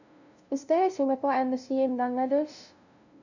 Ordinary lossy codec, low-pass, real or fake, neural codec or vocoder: none; 7.2 kHz; fake; codec, 16 kHz, 0.5 kbps, FunCodec, trained on LibriTTS, 25 frames a second